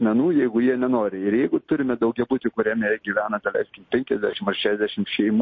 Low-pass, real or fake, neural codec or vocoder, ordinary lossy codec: 7.2 kHz; real; none; MP3, 32 kbps